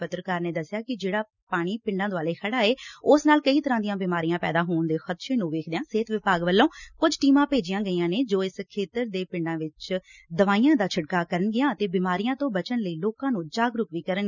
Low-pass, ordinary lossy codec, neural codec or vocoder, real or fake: 7.2 kHz; none; none; real